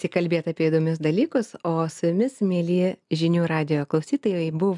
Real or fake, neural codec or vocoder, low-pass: real; none; 10.8 kHz